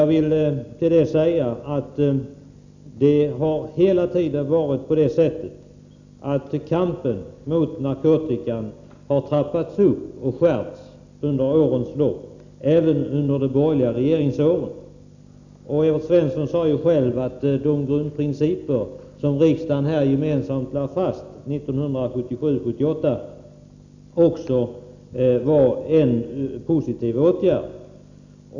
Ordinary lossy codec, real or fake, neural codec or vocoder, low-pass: none; real; none; 7.2 kHz